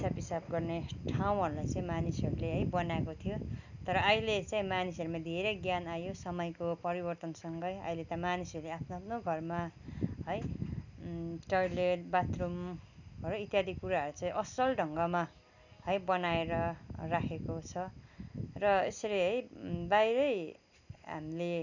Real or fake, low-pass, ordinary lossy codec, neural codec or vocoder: real; 7.2 kHz; none; none